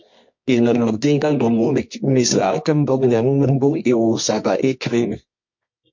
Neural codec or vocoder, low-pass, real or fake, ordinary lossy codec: codec, 24 kHz, 0.9 kbps, WavTokenizer, medium music audio release; 7.2 kHz; fake; MP3, 48 kbps